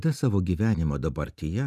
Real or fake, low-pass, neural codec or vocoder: real; 14.4 kHz; none